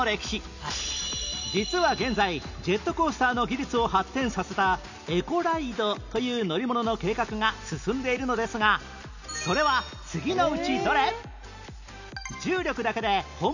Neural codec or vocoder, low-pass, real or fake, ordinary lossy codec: none; 7.2 kHz; real; none